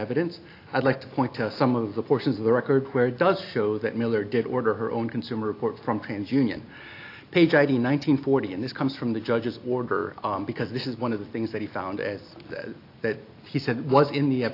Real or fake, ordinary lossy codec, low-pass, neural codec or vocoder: real; AAC, 32 kbps; 5.4 kHz; none